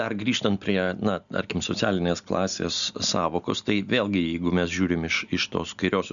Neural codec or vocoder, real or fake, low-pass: none; real; 7.2 kHz